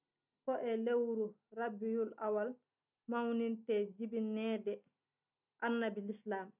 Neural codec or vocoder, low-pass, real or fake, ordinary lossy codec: none; 3.6 kHz; real; none